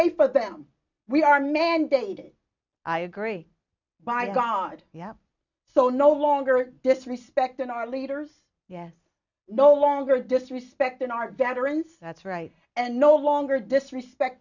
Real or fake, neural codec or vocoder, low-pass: real; none; 7.2 kHz